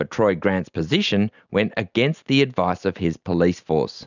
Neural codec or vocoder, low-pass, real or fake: none; 7.2 kHz; real